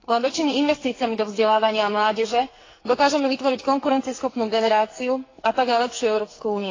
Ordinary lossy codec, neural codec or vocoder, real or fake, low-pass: AAC, 32 kbps; codec, 44.1 kHz, 2.6 kbps, SNAC; fake; 7.2 kHz